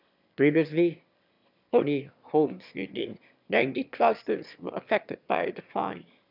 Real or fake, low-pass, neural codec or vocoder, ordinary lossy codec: fake; 5.4 kHz; autoencoder, 22.05 kHz, a latent of 192 numbers a frame, VITS, trained on one speaker; none